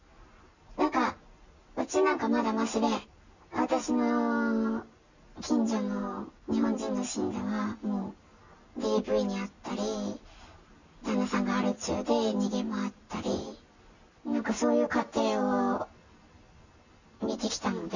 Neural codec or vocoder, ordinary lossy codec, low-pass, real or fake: none; none; 7.2 kHz; real